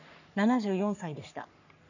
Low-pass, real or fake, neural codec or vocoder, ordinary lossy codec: 7.2 kHz; fake; codec, 44.1 kHz, 3.4 kbps, Pupu-Codec; none